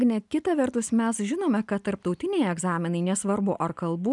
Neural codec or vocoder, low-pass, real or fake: none; 10.8 kHz; real